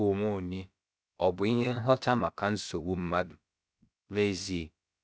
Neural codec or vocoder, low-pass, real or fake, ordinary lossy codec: codec, 16 kHz, about 1 kbps, DyCAST, with the encoder's durations; none; fake; none